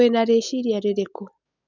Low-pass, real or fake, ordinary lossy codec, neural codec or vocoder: 7.2 kHz; real; none; none